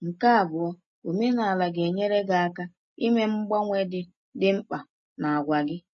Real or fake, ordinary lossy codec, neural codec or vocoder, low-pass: real; MP3, 32 kbps; none; 7.2 kHz